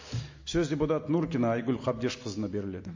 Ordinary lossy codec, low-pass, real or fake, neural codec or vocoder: MP3, 32 kbps; 7.2 kHz; real; none